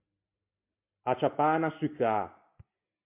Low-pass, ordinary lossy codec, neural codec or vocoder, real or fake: 3.6 kHz; MP3, 32 kbps; none; real